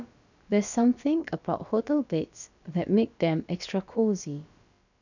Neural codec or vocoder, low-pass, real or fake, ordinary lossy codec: codec, 16 kHz, about 1 kbps, DyCAST, with the encoder's durations; 7.2 kHz; fake; none